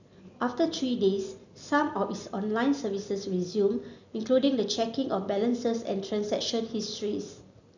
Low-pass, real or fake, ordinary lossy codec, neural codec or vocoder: 7.2 kHz; real; none; none